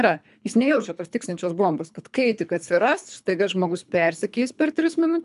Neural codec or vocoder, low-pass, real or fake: codec, 24 kHz, 3 kbps, HILCodec; 10.8 kHz; fake